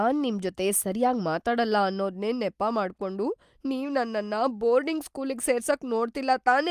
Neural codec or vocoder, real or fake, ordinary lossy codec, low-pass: none; real; Opus, 32 kbps; 14.4 kHz